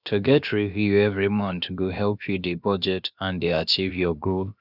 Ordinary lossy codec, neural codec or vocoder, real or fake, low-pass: none; codec, 16 kHz, about 1 kbps, DyCAST, with the encoder's durations; fake; 5.4 kHz